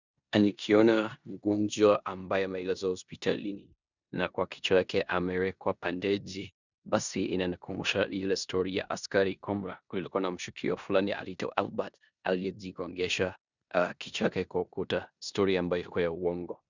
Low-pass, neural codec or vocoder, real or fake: 7.2 kHz; codec, 16 kHz in and 24 kHz out, 0.9 kbps, LongCat-Audio-Codec, four codebook decoder; fake